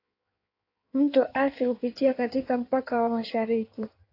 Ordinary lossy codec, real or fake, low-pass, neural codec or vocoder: AAC, 32 kbps; fake; 5.4 kHz; codec, 16 kHz in and 24 kHz out, 1.1 kbps, FireRedTTS-2 codec